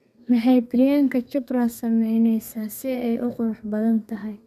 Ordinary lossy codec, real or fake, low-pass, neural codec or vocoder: none; fake; 14.4 kHz; codec, 32 kHz, 1.9 kbps, SNAC